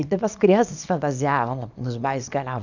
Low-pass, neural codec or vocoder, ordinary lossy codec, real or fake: 7.2 kHz; codec, 24 kHz, 0.9 kbps, WavTokenizer, small release; none; fake